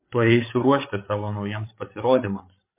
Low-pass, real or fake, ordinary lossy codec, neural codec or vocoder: 3.6 kHz; fake; MP3, 24 kbps; codec, 16 kHz, 8 kbps, FreqCodec, larger model